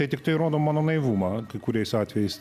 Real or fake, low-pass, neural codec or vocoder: real; 14.4 kHz; none